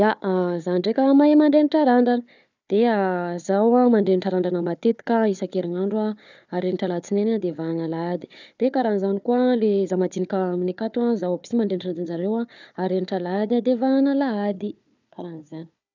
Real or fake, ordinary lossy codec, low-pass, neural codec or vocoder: fake; none; 7.2 kHz; codec, 16 kHz, 4 kbps, FunCodec, trained on Chinese and English, 50 frames a second